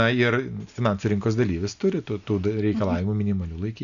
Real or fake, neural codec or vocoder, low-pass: real; none; 7.2 kHz